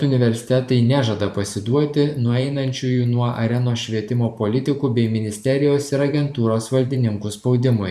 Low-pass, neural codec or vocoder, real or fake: 14.4 kHz; vocoder, 44.1 kHz, 128 mel bands every 512 samples, BigVGAN v2; fake